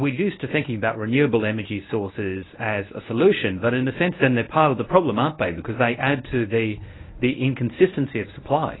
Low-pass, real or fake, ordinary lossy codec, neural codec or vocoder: 7.2 kHz; fake; AAC, 16 kbps; codec, 24 kHz, 0.9 kbps, WavTokenizer, medium speech release version 1